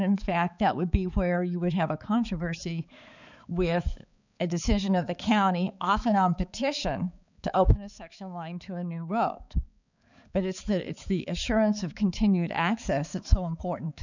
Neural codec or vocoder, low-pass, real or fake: codec, 16 kHz, 4 kbps, X-Codec, HuBERT features, trained on balanced general audio; 7.2 kHz; fake